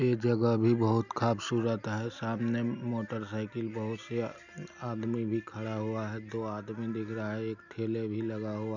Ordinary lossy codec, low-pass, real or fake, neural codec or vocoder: none; 7.2 kHz; real; none